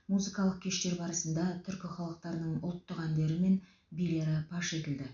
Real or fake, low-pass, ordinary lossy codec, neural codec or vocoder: real; 7.2 kHz; none; none